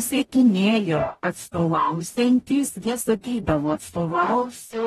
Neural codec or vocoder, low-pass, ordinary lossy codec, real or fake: codec, 44.1 kHz, 0.9 kbps, DAC; 19.8 kHz; AAC, 32 kbps; fake